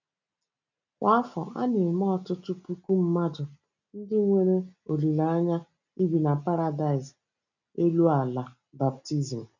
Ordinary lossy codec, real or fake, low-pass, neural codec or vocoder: none; real; 7.2 kHz; none